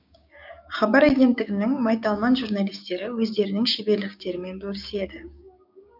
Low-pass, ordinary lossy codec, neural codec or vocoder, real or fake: 5.4 kHz; none; codec, 16 kHz, 6 kbps, DAC; fake